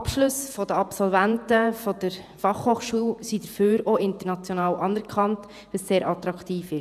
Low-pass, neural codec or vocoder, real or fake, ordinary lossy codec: 14.4 kHz; vocoder, 48 kHz, 128 mel bands, Vocos; fake; none